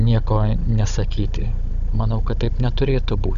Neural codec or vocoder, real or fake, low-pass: codec, 16 kHz, 16 kbps, FunCodec, trained on Chinese and English, 50 frames a second; fake; 7.2 kHz